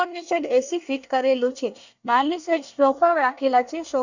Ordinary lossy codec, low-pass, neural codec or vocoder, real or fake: none; 7.2 kHz; codec, 24 kHz, 1 kbps, SNAC; fake